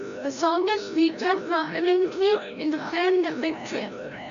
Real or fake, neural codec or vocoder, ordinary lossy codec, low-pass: fake; codec, 16 kHz, 0.5 kbps, FreqCodec, larger model; AAC, 96 kbps; 7.2 kHz